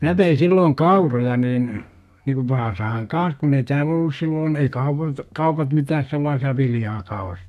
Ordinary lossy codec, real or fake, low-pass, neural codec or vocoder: none; fake; 14.4 kHz; codec, 32 kHz, 1.9 kbps, SNAC